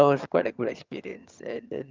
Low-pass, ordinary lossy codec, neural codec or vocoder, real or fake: 7.2 kHz; Opus, 24 kbps; vocoder, 22.05 kHz, 80 mel bands, HiFi-GAN; fake